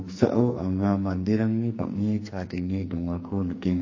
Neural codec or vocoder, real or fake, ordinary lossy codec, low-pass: codec, 44.1 kHz, 2.6 kbps, SNAC; fake; MP3, 32 kbps; 7.2 kHz